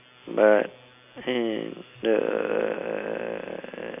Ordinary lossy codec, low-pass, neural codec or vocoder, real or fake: none; 3.6 kHz; none; real